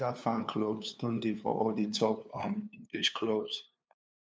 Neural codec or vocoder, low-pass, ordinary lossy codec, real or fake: codec, 16 kHz, 2 kbps, FunCodec, trained on LibriTTS, 25 frames a second; none; none; fake